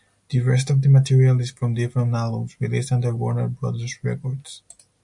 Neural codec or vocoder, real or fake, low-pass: none; real; 10.8 kHz